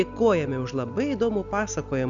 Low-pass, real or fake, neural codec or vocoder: 7.2 kHz; real; none